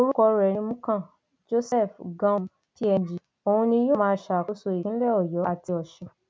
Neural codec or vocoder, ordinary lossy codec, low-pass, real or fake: none; none; none; real